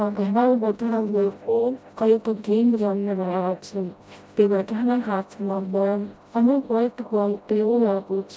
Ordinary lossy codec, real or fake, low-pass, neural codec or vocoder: none; fake; none; codec, 16 kHz, 0.5 kbps, FreqCodec, smaller model